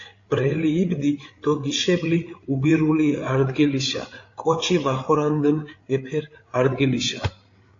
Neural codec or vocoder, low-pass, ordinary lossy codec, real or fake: codec, 16 kHz, 8 kbps, FreqCodec, larger model; 7.2 kHz; AAC, 48 kbps; fake